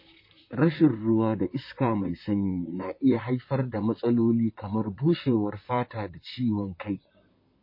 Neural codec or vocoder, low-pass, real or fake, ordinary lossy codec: vocoder, 44.1 kHz, 128 mel bands, Pupu-Vocoder; 5.4 kHz; fake; MP3, 24 kbps